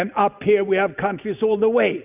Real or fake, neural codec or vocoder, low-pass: real; none; 3.6 kHz